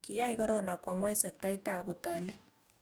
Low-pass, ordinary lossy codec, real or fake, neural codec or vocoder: none; none; fake; codec, 44.1 kHz, 2.6 kbps, DAC